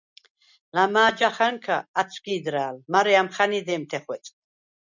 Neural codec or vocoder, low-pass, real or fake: none; 7.2 kHz; real